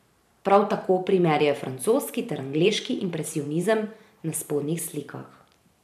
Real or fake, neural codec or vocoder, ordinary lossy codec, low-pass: fake; vocoder, 44.1 kHz, 128 mel bands every 512 samples, BigVGAN v2; none; 14.4 kHz